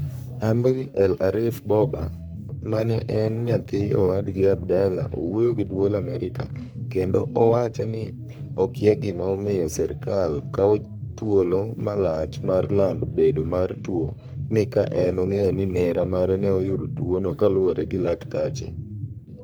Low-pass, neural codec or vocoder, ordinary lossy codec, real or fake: none; codec, 44.1 kHz, 3.4 kbps, Pupu-Codec; none; fake